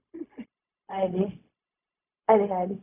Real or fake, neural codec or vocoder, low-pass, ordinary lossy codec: fake; codec, 16 kHz, 0.4 kbps, LongCat-Audio-Codec; 3.6 kHz; none